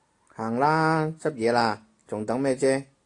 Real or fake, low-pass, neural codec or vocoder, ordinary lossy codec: real; 10.8 kHz; none; AAC, 48 kbps